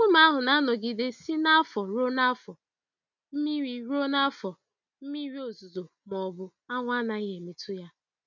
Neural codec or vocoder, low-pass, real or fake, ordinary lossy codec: none; 7.2 kHz; real; none